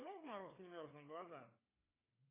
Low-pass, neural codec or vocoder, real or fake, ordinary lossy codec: 3.6 kHz; codec, 16 kHz, 4 kbps, FreqCodec, larger model; fake; AAC, 16 kbps